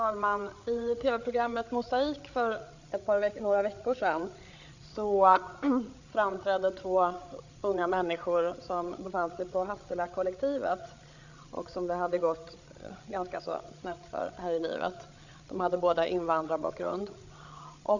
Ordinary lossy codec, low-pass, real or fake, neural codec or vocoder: none; 7.2 kHz; fake; codec, 16 kHz, 8 kbps, FreqCodec, larger model